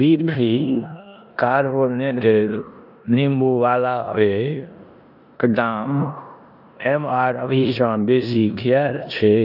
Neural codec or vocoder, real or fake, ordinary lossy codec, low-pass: codec, 16 kHz in and 24 kHz out, 0.9 kbps, LongCat-Audio-Codec, four codebook decoder; fake; none; 5.4 kHz